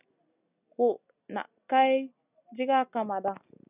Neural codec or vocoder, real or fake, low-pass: none; real; 3.6 kHz